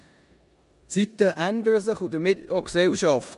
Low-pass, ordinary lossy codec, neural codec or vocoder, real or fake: 10.8 kHz; MP3, 64 kbps; codec, 16 kHz in and 24 kHz out, 0.9 kbps, LongCat-Audio-Codec, four codebook decoder; fake